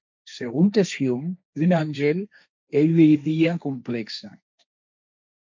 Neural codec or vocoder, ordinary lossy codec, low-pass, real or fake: codec, 16 kHz, 1.1 kbps, Voila-Tokenizer; MP3, 64 kbps; 7.2 kHz; fake